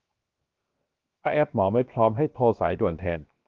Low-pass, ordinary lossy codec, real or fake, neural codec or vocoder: 7.2 kHz; Opus, 32 kbps; fake; codec, 16 kHz, 0.7 kbps, FocalCodec